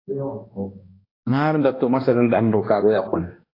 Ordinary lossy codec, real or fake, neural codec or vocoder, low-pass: AAC, 24 kbps; fake; codec, 16 kHz, 1 kbps, X-Codec, HuBERT features, trained on balanced general audio; 5.4 kHz